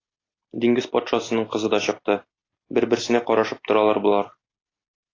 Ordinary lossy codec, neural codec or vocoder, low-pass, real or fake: AAC, 32 kbps; none; 7.2 kHz; real